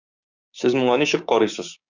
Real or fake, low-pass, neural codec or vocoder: fake; 7.2 kHz; codec, 16 kHz, 6 kbps, DAC